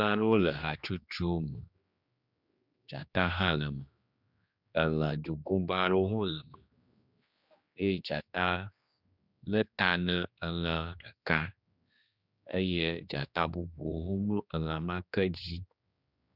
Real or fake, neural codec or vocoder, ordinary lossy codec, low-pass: fake; codec, 16 kHz, 2 kbps, X-Codec, HuBERT features, trained on general audio; Opus, 64 kbps; 5.4 kHz